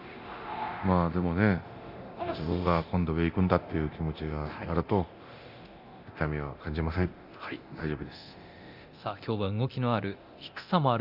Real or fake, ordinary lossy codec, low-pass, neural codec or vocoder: fake; Opus, 64 kbps; 5.4 kHz; codec, 24 kHz, 0.9 kbps, DualCodec